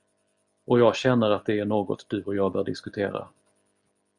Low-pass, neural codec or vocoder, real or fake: 10.8 kHz; none; real